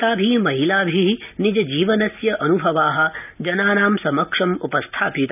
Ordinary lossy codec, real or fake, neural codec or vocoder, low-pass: none; real; none; 3.6 kHz